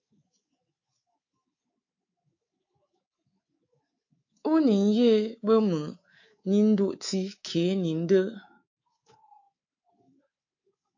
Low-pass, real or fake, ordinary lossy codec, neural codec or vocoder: 7.2 kHz; fake; AAC, 48 kbps; codec, 24 kHz, 3.1 kbps, DualCodec